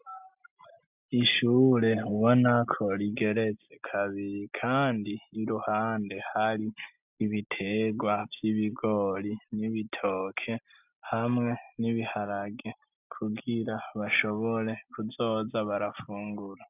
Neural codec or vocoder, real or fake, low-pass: none; real; 3.6 kHz